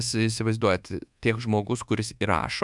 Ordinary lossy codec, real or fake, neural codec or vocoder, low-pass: MP3, 96 kbps; fake; codec, 24 kHz, 1.2 kbps, DualCodec; 10.8 kHz